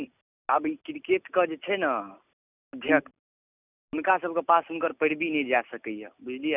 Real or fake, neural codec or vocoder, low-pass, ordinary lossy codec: real; none; 3.6 kHz; none